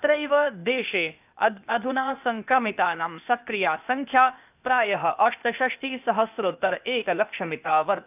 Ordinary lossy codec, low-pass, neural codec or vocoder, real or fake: none; 3.6 kHz; codec, 16 kHz, 0.8 kbps, ZipCodec; fake